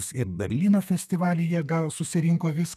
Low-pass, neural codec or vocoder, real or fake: 14.4 kHz; codec, 32 kHz, 1.9 kbps, SNAC; fake